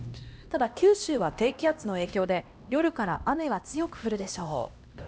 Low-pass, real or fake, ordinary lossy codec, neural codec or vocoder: none; fake; none; codec, 16 kHz, 1 kbps, X-Codec, HuBERT features, trained on LibriSpeech